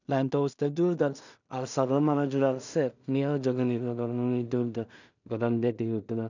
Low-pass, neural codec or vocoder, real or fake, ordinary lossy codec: 7.2 kHz; codec, 16 kHz in and 24 kHz out, 0.4 kbps, LongCat-Audio-Codec, two codebook decoder; fake; none